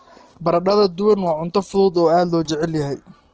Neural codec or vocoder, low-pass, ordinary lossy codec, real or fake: none; 7.2 kHz; Opus, 16 kbps; real